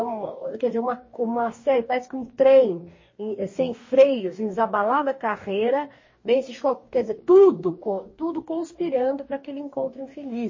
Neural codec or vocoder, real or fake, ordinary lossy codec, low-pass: codec, 44.1 kHz, 2.6 kbps, DAC; fake; MP3, 32 kbps; 7.2 kHz